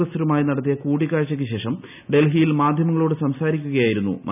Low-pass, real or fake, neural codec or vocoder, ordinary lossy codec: 3.6 kHz; real; none; none